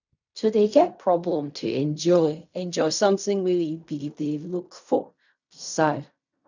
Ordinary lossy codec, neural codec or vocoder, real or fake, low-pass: none; codec, 16 kHz in and 24 kHz out, 0.4 kbps, LongCat-Audio-Codec, fine tuned four codebook decoder; fake; 7.2 kHz